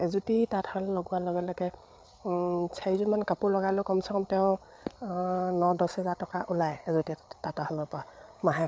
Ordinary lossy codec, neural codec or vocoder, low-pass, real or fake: none; codec, 16 kHz, 8 kbps, FreqCodec, larger model; none; fake